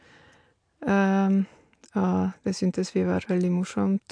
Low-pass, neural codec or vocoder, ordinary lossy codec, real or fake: 9.9 kHz; none; AAC, 64 kbps; real